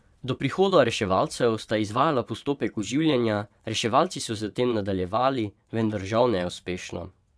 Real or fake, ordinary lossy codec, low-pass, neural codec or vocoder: fake; none; none; vocoder, 22.05 kHz, 80 mel bands, WaveNeXt